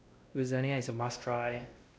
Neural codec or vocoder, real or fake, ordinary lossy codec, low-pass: codec, 16 kHz, 1 kbps, X-Codec, WavLM features, trained on Multilingual LibriSpeech; fake; none; none